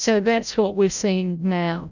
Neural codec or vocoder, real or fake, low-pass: codec, 16 kHz, 0.5 kbps, FreqCodec, larger model; fake; 7.2 kHz